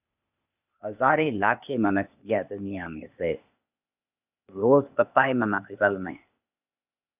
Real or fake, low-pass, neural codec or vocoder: fake; 3.6 kHz; codec, 16 kHz, 0.8 kbps, ZipCodec